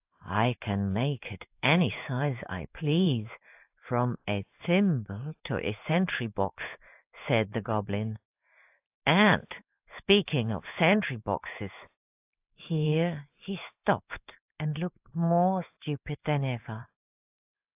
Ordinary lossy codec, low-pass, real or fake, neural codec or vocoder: AAC, 32 kbps; 3.6 kHz; fake; codec, 16 kHz in and 24 kHz out, 1 kbps, XY-Tokenizer